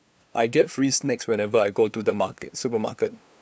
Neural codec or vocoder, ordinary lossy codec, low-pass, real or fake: codec, 16 kHz, 2 kbps, FunCodec, trained on LibriTTS, 25 frames a second; none; none; fake